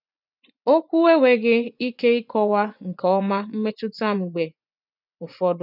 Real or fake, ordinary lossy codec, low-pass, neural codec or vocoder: fake; none; 5.4 kHz; vocoder, 24 kHz, 100 mel bands, Vocos